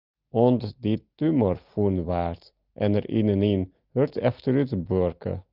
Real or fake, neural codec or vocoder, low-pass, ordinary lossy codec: real; none; 5.4 kHz; Opus, 24 kbps